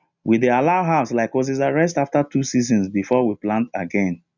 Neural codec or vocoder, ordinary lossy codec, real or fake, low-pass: none; none; real; 7.2 kHz